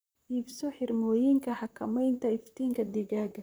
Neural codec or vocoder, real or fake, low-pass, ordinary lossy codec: vocoder, 44.1 kHz, 128 mel bands, Pupu-Vocoder; fake; none; none